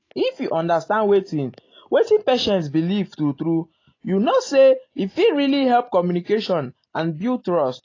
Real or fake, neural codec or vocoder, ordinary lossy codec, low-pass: real; none; AAC, 32 kbps; 7.2 kHz